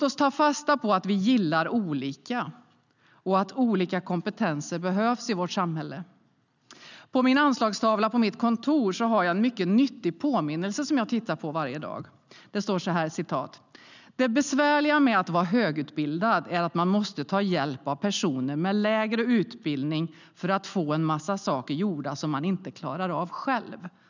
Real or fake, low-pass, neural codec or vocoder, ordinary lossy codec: real; 7.2 kHz; none; none